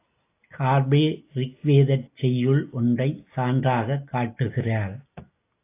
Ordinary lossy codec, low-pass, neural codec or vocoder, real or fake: AAC, 24 kbps; 3.6 kHz; none; real